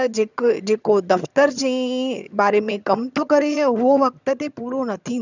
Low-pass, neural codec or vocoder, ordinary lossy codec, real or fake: 7.2 kHz; vocoder, 22.05 kHz, 80 mel bands, HiFi-GAN; none; fake